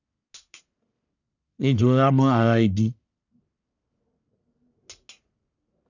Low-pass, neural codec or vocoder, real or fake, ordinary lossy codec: 7.2 kHz; codec, 44.1 kHz, 1.7 kbps, Pupu-Codec; fake; none